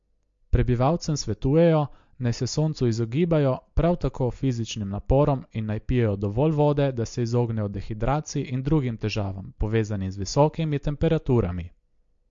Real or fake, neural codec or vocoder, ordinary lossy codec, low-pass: real; none; MP3, 48 kbps; 7.2 kHz